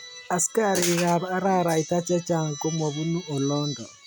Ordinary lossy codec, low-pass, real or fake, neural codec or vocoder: none; none; real; none